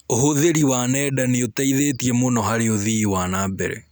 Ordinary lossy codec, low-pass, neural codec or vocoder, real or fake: none; none; none; real